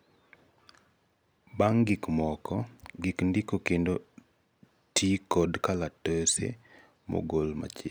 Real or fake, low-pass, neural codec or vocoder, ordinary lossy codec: real; none; none; none